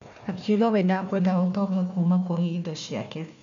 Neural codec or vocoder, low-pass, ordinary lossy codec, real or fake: codec, 16 kHz, 1 kbps, FunCodec, trained on Chinese and English, 50 frames a second; 7.2 kHz; none; fake